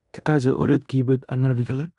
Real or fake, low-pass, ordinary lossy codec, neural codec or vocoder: fake; 10.8 kHz; none; codec, 16 kHz in and 24 kHz out, 0.9 kbps, LongCat-Audio-Codec, fine tuned four codebook decoder